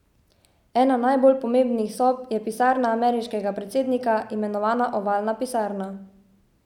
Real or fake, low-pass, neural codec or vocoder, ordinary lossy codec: real; 19.8 kHz; none; none